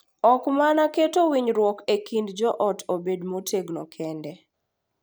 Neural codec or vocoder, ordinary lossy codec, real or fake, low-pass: none; none; real; none